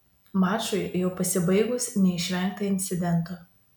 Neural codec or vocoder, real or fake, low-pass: none; real; 19.8 kHz